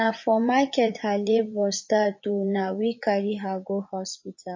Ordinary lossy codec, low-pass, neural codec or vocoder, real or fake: MP3, 32 kbps; 7.2 kHz; vocoder, 44.1 kHz, 80 mel bands, Vocos; fake